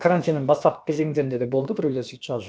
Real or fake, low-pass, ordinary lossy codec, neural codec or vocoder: fake; none; none; codec, 16 kHz, about 1 kbps, DyCAST, with the encoder's durations